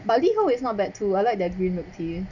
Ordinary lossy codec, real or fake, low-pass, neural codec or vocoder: none; real; 7.2 kHz; none